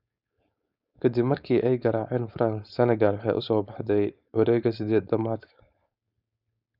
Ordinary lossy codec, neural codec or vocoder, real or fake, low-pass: none; codec, 16 kHz, 4.8 kbps, FACodec; fake; 5.4 kHz